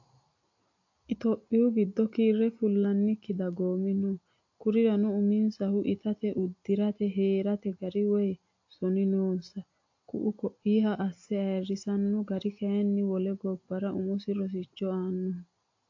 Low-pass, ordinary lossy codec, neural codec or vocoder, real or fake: 7.2 kHz; AAC, 48 kbps; none; real